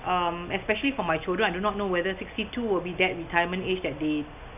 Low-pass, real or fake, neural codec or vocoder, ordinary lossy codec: 3.6 kHz; real; none; none